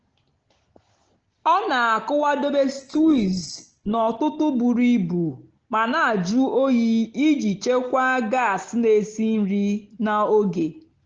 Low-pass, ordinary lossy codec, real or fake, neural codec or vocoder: 7.2 kHz; Opus, 16 kbps; real; none